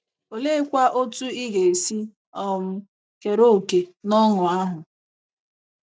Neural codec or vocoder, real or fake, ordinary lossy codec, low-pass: none; real; none; none